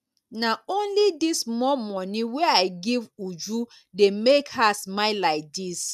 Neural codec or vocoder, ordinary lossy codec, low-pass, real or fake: none; none; 14.4 kHz; real